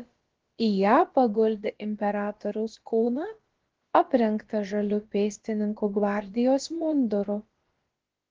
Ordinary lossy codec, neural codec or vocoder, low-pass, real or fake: Opus, 16 kbps; codec, 16 kHz, about 1 kbps, DyCAST, with the encoder's durations; 7.2 kHz; fake